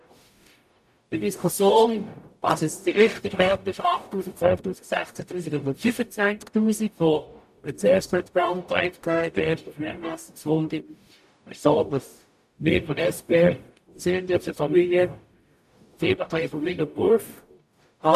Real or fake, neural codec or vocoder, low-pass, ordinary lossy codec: fake; codec, 44.1 kHz, 0.9 kbps, DAC; 14.4 kHz; AAC, 96 kbps